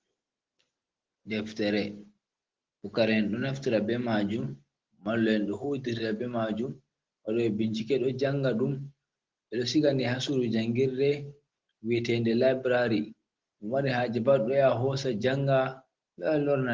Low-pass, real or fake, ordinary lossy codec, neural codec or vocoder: 7.2 kHz; real; Opus, 16 kbps; none